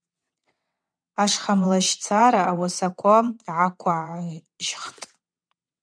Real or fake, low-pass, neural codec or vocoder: fake; 9.9 kHz; vocoder, 22.05 kHz, 80 mel bands, WaveNeXt